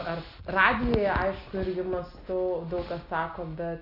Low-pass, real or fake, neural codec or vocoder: 5.4 kHz; real; none